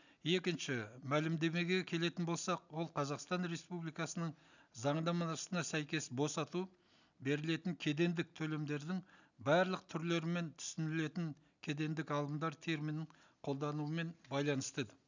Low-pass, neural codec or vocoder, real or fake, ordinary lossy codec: 7.2 kHz; none; real; none